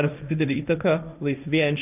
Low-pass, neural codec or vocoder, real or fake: 3.6 kHz; codec, 16 kHz, 1.1 kbps, Voila-Tokenizer; fake